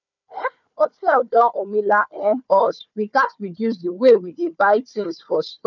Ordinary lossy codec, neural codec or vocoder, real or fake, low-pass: none; codec, 16 kHz, 4 kbps, FunCodec, trained on Chinese and English, 50 frames a second; fake; 7.2 kHz